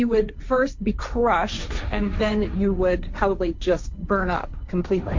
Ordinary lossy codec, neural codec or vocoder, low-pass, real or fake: MP3, 48 kbps; codec, 16 kHz, 1.1 kbps, Voila-Tokenizer; 7.2 kHz; fake